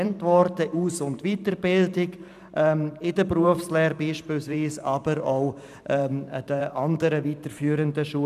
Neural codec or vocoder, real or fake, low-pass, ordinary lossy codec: vocoder, 44.1 kHz, 128 mel bands every 512 samples, BigVGAN v2; fake; 14.4 kHz; none